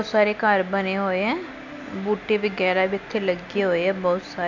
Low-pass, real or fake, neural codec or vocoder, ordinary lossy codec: 7.2 kHz; real; none; none